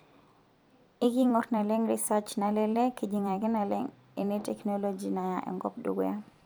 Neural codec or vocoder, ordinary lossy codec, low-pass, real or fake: vocoder, 44.1 kHz, 128 mel bands every 256 samples, BigVGAN v2; none; none; fake